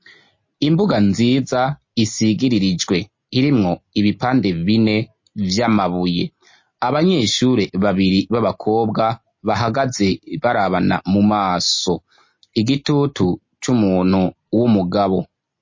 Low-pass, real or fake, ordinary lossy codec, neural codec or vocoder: 7.2 kHz; real; MP3, 32 kbps; none